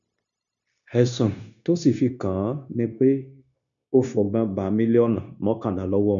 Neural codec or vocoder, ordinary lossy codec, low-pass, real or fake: codec, 16 kHz, 0.9 kbps, LongCat-Audio-Codec; none; 7.2 kHz; fake